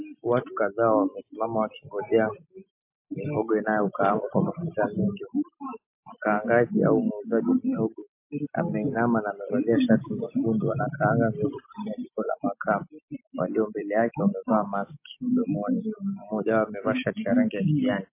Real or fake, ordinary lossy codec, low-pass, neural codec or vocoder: real; MP3, 24 kbps; 3.6 kHz; none